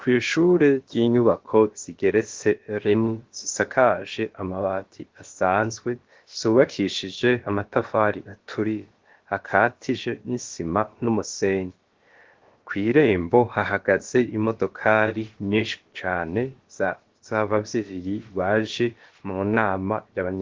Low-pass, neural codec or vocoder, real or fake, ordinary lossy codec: 7.2 kHz; codec, 16 kHz, about 1 kbps, DyCAST, with the encoder's durations; fake; Opus, 16 kbps